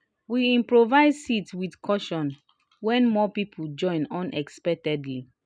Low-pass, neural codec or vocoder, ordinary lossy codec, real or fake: 9.9 kHz; none; none; real